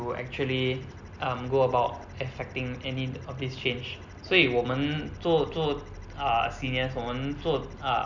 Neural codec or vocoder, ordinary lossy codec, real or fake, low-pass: none; none; real; 7.2 kHz